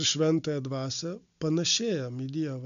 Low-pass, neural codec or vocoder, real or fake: 7.2 kHz; none; real